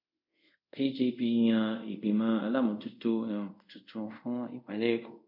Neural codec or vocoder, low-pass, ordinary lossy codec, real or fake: codec, 24 kHz, 0.5 kbps, DualCodec; 5.4 kHz; none; fake